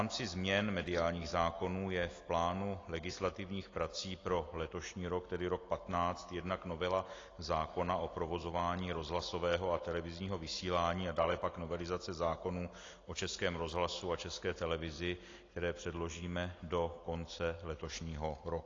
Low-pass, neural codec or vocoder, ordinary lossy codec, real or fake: 7.2 kHz; none; AAC, 32 kbps; real